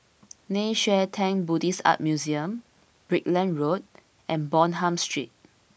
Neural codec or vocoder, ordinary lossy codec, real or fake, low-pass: none; none; real; none